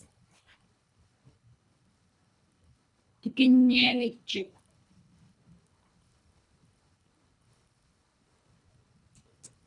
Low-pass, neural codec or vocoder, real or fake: 10.8 kHz; codec, 24 kHz, 1.5 kbps, HILCodec; fake